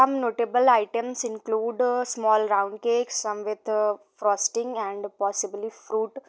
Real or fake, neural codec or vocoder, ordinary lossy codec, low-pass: real; none; none; none